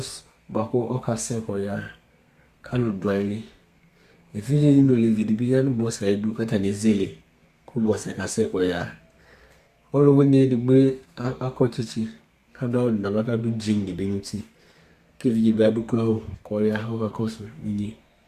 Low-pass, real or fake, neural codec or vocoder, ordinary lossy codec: 14.4 kHz; fake; codec, 32 kHz, 1.9 kbps, SNAC; Opus, 64 kbps